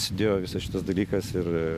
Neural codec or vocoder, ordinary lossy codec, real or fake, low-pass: none; AAC, 96 kbps; real; 14.4 kHz